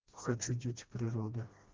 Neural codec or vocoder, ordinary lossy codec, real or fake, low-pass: codec, 16 kHz, 1 kbps, FreqCodec, smaller model; Opus, 16 kbps; fake; 7.2 kHz